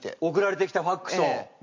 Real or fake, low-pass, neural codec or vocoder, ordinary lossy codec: real; 7.2 kHz; none; MP3, 48 kbps